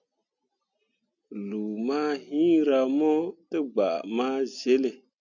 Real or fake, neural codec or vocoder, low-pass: real; none; 7.2 kHz